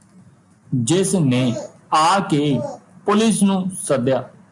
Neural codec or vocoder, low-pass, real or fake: none; 10.8 kHz; real